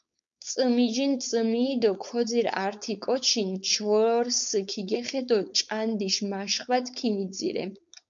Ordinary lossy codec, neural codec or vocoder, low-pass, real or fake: MP3, 64 kbps; codec, 16 kHz, 4.8 kbps, FACodec; 7.2 kHz; fake